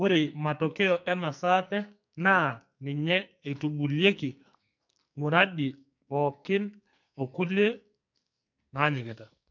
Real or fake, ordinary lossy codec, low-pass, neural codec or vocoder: fake; MP3, 64 kbps; 7.2 kHz; codec, 44.1 kHz, 2.6 kbps, SNAC